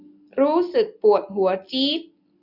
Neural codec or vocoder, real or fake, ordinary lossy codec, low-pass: none; real; none; 5.4 kHz